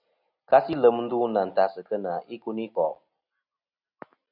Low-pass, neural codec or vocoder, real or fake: 5.4 kHz; none; real